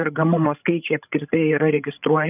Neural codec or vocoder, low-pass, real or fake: codec, 16 kHz, 16 kbps, FreqCodec, larger model; 3.6 kHz; fake